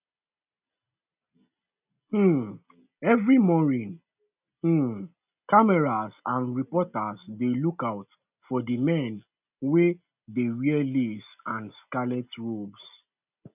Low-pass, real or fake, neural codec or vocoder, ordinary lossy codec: 3.6 kHz; real; none; none